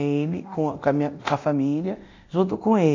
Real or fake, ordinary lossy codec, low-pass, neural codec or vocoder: fake; AAC, 48 kbps; 7.2 kHz; codec, 24 kHz, 0.9 kbps, DualCodec